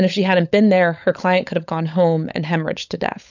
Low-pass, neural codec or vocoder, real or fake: 7.2 kHz; codec, 16 kHz, 16 kbps, FunCodec, trained on LibriTTS, 50 frames a second; fake